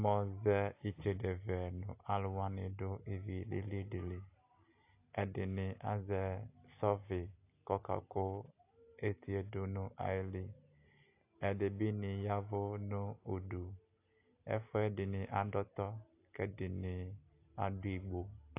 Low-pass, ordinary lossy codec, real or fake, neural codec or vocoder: 3.6 kHz; AAC, 32 kbps; real; none